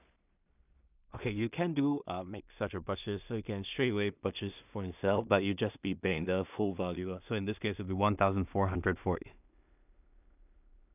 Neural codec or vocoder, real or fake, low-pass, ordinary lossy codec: codec, 16 kHz in and 24 kHz out, 0.4 kbps, LongCat-Audio-Codec, two codebook decoder; fake; 3.6 kHz; none